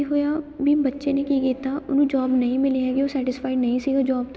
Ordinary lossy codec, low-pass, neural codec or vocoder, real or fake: none; none; none; real